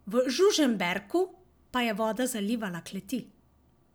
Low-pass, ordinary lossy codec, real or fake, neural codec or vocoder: none; none; real; none